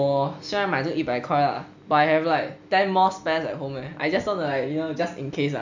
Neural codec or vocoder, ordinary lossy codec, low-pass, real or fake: none; none; 7.2 kHz; real